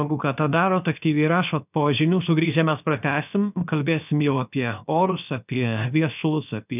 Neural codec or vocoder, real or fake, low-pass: codec, 16 kHz, 0.7 kbps, FocalCodec; fake; 3.6 kHz